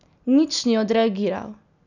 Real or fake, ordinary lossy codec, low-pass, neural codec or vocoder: real; none; 7.2 kHz; none